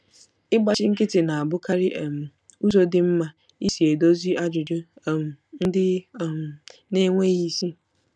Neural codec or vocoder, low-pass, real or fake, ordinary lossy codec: none; none; real; none